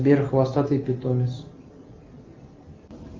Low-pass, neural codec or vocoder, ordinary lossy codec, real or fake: 7.2 kHz; none; Opus, 24 kbps; real